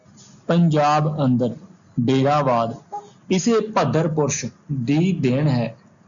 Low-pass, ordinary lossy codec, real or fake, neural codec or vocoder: 7.2 kHz; MP3, 96 kbps; real; none